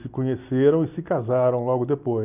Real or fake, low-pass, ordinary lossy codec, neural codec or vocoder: real; 3.6 kHz; none; none